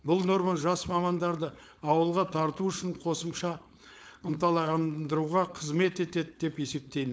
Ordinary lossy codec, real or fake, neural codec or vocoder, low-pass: none; fake; codec, 16 kHz, 4.8 kbps, FACodec; none